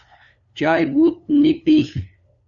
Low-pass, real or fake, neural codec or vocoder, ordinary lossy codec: 7.2 kHz; fake; codec, 16 kHz, 2 kbps, FunCodec, trained on LibriTTS, 25 frames a second; Opus, 64 kbps